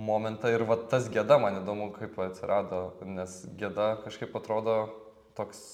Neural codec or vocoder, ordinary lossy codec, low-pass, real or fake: none; MP3, 96 kbps; 19.8 kHz; real